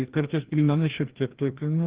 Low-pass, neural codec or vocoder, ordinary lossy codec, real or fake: 3.6 kHz; codec, 16 kHz, 1 kbps, FreqCodec, larger model; Opus, 16 kbps; fake